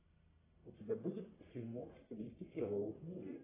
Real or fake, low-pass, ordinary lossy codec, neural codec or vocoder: fake; 3.6 kHz; AAC, 16 kbps; codec, 44.1 kHz, 1.7 kbps, Pupu-Codec